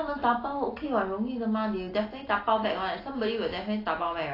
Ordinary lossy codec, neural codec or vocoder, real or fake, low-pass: AAC, 24 kbps; none; real; 5.4 kHz